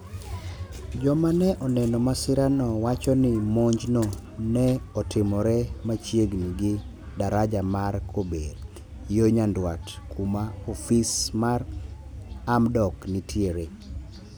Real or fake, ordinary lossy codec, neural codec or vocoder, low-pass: real; none; none; none